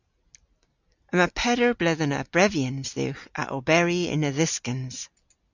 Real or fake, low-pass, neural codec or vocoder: real; 7.2 kHz; none